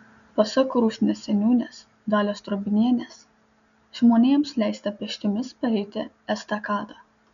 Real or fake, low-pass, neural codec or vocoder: real; 7.2 kHz; none